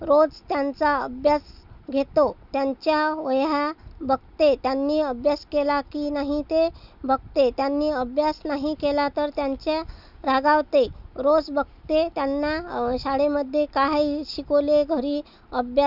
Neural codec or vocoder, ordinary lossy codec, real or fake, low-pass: none; none; real; 5.4 kHz